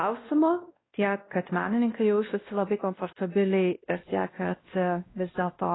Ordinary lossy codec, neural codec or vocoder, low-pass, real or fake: AAC, 16 kbps; codec, 16 kHz, 1 kbps, X-Codec, WavLM features, trained on Multilingual LibriSpeech; 7.2 kHz; fake